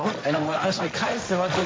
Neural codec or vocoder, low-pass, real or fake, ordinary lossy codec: codec, 16 kHz, 1.1 kbps, Voila-Tokenizer; none; fake; none